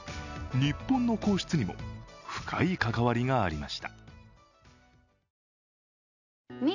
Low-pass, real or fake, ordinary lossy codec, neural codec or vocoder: 7.2 kHz; real; none; none